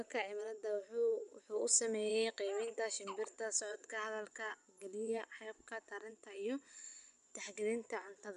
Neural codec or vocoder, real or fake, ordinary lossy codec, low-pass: vocoder, 24 kHz, 100 mel bands, Vocos; fake; none; 10.8 kHz